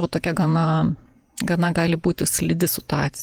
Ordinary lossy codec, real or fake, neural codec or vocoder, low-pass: Opus, 24 kbps; fake; vocoder, 48 kHz, 128 mel bands, Vocos; 19.8 kHz